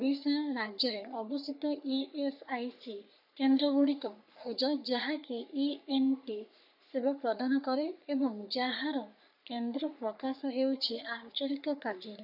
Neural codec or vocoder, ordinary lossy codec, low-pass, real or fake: codec, 44.1 kHz, 3.4 kbps, Pupu-Codec; none; 5.4 kHz; fake